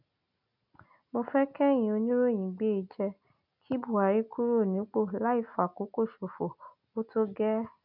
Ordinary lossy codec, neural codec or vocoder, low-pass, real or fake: none; none; 5.4 kHz; real